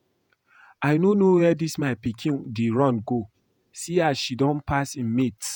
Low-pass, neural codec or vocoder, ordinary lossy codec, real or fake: none; vocoder, 48 kHz, 128 mel bands, Vocos; none; fake